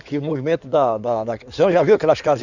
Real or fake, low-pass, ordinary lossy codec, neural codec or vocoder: fake; 7.2 kHz; none; codec, 16 kHz in and 24 kHz out, 2.2 kbps, FireRedTTS-2 codec